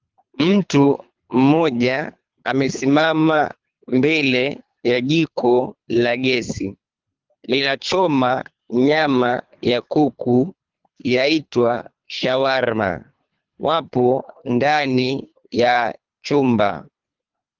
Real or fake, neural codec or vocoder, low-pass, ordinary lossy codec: fake; codec, 24 kHz, 3 kbps, HILCodec; 7.2 kHz; Opus, 32 kbps